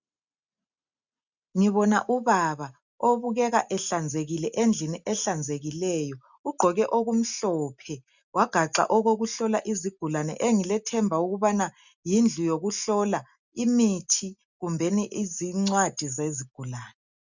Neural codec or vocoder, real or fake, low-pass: none; real; 7.2 kHz